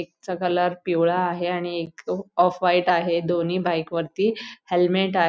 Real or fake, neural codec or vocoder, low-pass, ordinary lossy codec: real; none; none; none